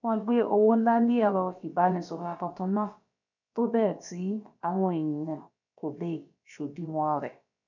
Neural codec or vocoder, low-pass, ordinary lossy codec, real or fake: codec, 16 kHz, about 1 kbps, DyCAST, with the encoder's durations; 7.2 kHz; none; fake